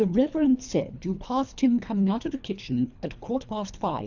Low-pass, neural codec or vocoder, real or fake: 7.2 kHz; codec, 24 kHz, 3 kbps, HILCodec; fake